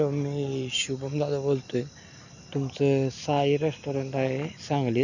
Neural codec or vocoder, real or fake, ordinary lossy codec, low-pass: none; real; none; 7.2 kHz